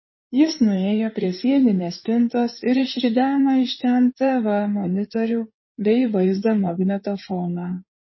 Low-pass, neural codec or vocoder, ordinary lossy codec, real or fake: 7.2 kHz; codec, 16 kHz in and 24 kHz out, 2.2 kbps, FireRedTTS-2 codec; MP3, 24 kbps; fake